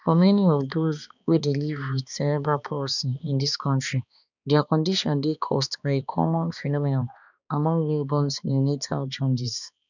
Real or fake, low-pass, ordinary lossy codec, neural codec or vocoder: fake; 7.2 kHz; none; codec, 16 kHz, 2 kbps, X-Codec, HuBERT features, trained on balanced general audio